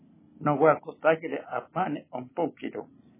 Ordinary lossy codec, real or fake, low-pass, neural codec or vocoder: MP3, 16 kbps; real; 3.6 kHz; none